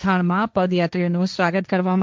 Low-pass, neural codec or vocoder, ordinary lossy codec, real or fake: none; codec, 16 kHz, 1.1 kbps, Voila-Tokenizer; none; fake